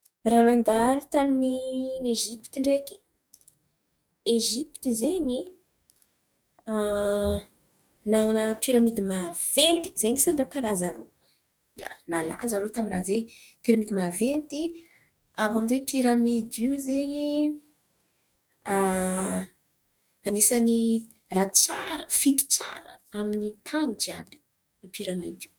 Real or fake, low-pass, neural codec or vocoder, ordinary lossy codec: fake; none; codec, 44.1 kHz, 2.6 kbps, DAC; none